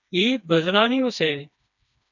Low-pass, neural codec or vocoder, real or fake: 7.2 kHz; codec, 16 kHz, 2 kbps, FreqCodec, smaller model; fake